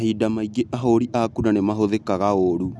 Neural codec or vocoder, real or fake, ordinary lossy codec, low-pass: none; real; none; none